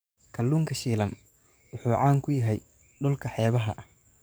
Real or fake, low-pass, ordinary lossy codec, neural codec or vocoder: fake; none; none; codec, 44.1 kHz, 7.8 kbps, DAC